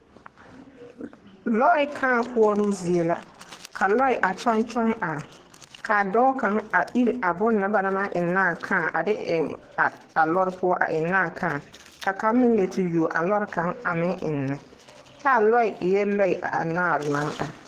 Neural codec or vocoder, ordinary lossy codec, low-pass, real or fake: codec, 44.1 kHz, 2.6 kbps, SNAC; Opus, 16 kbps; 14.4 kHz; fake